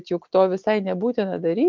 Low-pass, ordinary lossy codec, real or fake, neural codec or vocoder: 7.2 kHz; Opus, 32 kbps; real; none